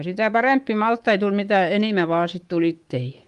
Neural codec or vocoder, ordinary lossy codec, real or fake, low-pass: codec, 24 kHz, 3.1 kbps, DualCodec; Opus, 24 kbps; fake; 10.8 kHz